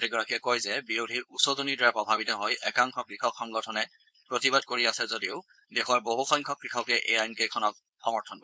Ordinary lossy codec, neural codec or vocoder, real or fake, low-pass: none; codec, 16 kHz, 4.8 kbps, FACodec; fake; none